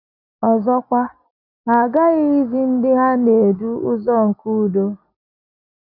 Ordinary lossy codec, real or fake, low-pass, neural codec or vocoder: none; real; 5.4 kHz; none